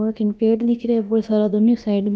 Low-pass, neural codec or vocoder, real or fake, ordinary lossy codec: none; codec, 16 kHz, about 1 kbps, DyCAST, with the encoder's durations; fake; none